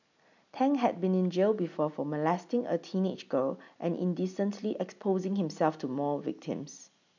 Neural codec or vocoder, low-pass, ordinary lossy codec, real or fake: none; 7.2 kHz; none; real